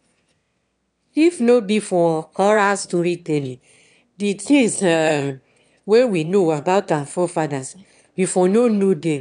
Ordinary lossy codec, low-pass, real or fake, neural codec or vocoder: none; 9.9 kHz; fake; autoencoder, 22.05 kHz, a latent of 192 numbers a frame, VITS, trained on one speaker